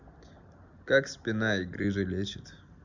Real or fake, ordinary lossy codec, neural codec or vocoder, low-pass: real; none; none; 7.2 kHz